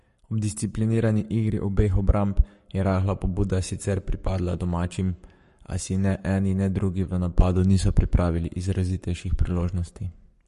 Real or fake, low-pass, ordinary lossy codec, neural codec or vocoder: fake; 14.4 kHz; MP3, 48 kbps; codec, 44.1 kHz, 7.8 kbps, Pupu-Codec